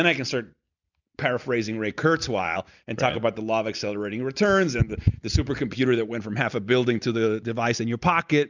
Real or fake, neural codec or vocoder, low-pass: real; none; 7.2 kHz